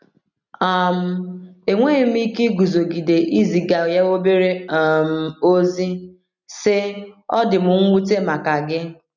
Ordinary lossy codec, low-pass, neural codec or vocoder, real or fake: none; 7.2 kHz; none; real